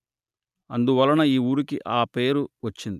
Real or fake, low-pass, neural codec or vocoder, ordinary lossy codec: real; 14.4 kHz; none; none